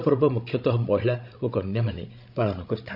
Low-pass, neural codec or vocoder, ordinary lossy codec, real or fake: 5.4 kHz; codec, 16 kHz, 16 kbps, FreqCodec, larger model; none; fake